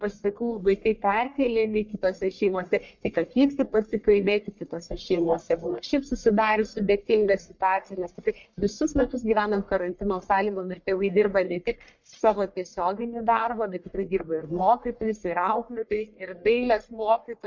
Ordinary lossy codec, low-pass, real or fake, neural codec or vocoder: MP3, 64 kbps; 7.2 kHz; fake; codec, 44.1 kHz, 1.7 kbps, Pupu-Codec